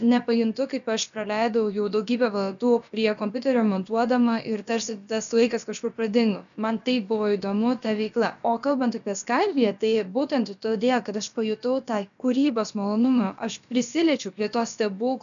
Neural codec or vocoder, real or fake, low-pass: codec, 16 kHz, about 1 kbps, DyCAST, with the encoder's durations; fake; 7.2 kHz